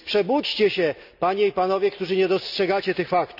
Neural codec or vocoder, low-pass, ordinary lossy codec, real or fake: none; 5.4 kHz; none; real